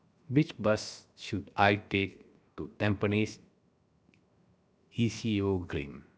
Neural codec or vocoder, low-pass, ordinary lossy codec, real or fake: codec, 16 kHz, 0.7 kbps, FocalCodec; none; none; fake